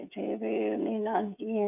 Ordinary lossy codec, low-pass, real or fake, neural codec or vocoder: none; 3.6 kHz; fake; codec, 16 kHz, 16 kbps, FreqCodec, smaller model